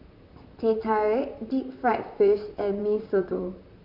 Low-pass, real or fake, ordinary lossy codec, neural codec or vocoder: 5.4 kHz; fake; none; vocoder, 44.1 kHz, 128 mel bands, Pupu-Vocoder